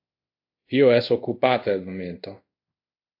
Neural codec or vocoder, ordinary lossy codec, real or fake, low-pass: codec, 24 kHz, 0.5 kbps, DualCodec; AAC, 32 kbps; fake; 5.4 kHz